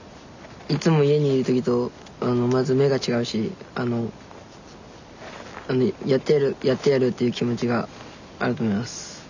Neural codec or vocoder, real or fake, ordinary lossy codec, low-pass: none; real; none; 7.2 kHz